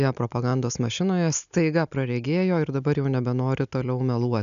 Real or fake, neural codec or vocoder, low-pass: real; none; 7.2 kHz